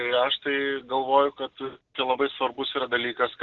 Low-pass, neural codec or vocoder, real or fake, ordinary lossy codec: 7.2 kHz; none; real; Opus, 16 kbps